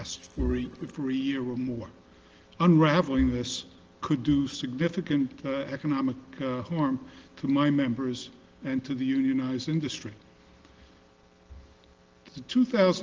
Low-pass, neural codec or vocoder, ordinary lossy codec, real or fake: 7.2 kHz; none; Opus, 16 kbps; real